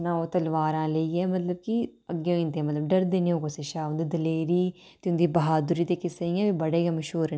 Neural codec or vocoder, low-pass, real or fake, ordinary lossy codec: none; none; real; none